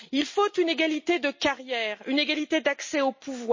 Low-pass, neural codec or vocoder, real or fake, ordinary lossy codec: 7.2 kHz; none; real; MP3, 32 kbps